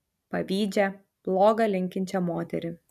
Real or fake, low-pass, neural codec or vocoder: real; 14.4 kHz; none